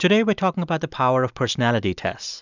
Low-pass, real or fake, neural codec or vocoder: 7.2 kHz; real; none